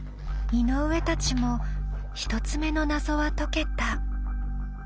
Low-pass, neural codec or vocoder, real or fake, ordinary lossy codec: none; none; real; none